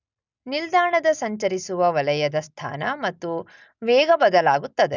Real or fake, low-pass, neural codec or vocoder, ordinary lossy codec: real; 7.2 kHz; none; none